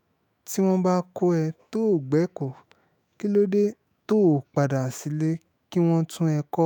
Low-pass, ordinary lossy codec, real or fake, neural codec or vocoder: none; none; fake; autoencoder, 48 kHz, 128 numbers a frame, DAC-VAE, trained on Japanese speech